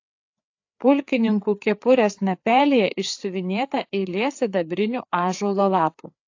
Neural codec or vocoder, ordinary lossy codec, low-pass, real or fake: codec, 16 kHz, 4 kbps, FreqCodec, larger model; AAC, 48 kbps; 7.2 kHz; fake